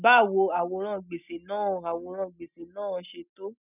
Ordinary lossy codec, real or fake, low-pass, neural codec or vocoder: none; real; 3.6 kHz; none